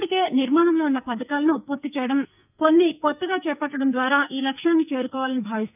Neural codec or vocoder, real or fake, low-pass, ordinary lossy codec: codec, 44.1 kHz, 2.6 kbps, SNAC; fake; 3.6 kHz; none